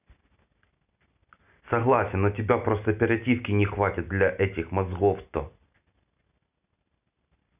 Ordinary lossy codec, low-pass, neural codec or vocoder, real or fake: none; 3.6 kHz; none; real